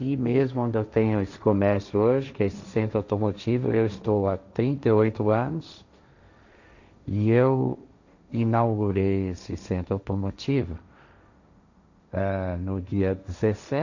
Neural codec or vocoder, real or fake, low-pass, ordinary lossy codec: codec, 16 kHz, 1.1 kbps, Voila-Tokenizer; fake; 7.2 kHz; none